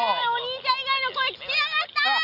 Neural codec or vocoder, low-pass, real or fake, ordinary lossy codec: none; 5.4 kHz; real; MP3, 48 kbps